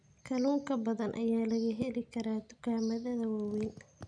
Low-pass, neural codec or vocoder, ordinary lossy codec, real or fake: 9.9 kHz; none; none; real